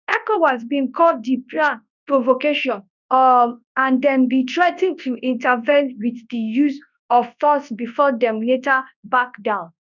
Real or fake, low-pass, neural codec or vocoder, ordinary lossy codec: fake; 7.2 kHz; codec, 24 kHz, 0.9 kbps, WavTokenizer, large speech release; none